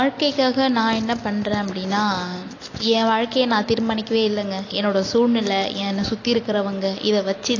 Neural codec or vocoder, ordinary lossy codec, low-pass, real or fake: none; AAC, 32 kbps; 7.2 kHz; real